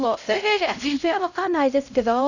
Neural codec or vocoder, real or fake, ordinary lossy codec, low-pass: codec, 16 kHz, 0.5 kbps, X-Codec, HuBERT features, trained on LibriSpeech; fake; none; 7.2 kHz